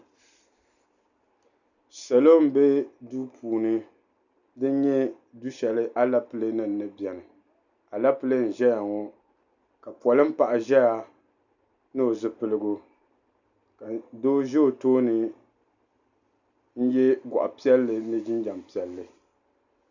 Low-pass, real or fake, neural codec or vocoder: 7.2 kHz; real; none